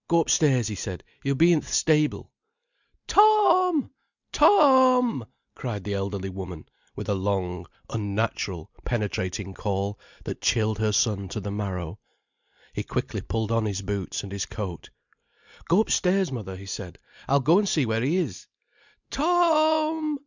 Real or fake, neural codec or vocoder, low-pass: real; none; 7.2 kHz